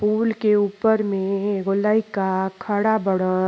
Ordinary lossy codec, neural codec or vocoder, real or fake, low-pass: none; none; real; none